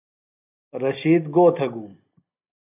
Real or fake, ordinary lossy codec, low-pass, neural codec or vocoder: real; AAC, 24 kbps; 3.6 kHz; none